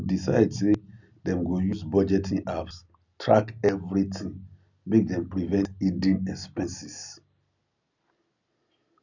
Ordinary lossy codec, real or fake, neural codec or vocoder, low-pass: none; real; none; 7.2 kHz